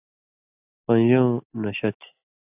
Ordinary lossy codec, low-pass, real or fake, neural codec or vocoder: AAC, 32 kbps; 3.6 kHz; real; none